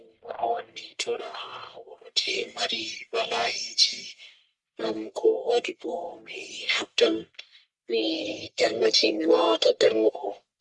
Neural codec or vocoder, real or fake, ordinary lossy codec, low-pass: codec, 44.1 kHz, 1.7 kbps, Pupu-Codec; fake; Opus, 64 kbps; 10.8 kHz